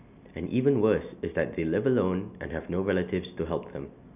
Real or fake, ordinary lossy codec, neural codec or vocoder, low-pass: real; none; none; 3.6 kHz